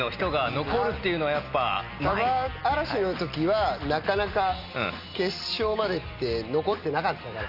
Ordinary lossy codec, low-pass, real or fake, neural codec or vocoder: none; 5.4 kHz; real; none